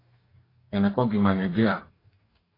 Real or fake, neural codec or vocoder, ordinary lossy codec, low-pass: fake; codec, 44.1 kHz, 2.6 kbps, DAC; AAC, 24 kbps; 5.4 kHz